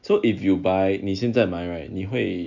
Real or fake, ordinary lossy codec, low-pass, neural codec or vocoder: real; none; 7.2 kHz; none